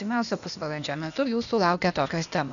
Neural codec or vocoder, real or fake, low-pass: codec, 16 kHz, 0.8 kbps, ZipCodec; fake; 7.2 kHz